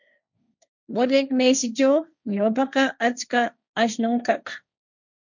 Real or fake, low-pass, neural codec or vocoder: fake; 7.2 kHz; codec, 16 kHz, 1.1 kbps, Voila-Tokenizer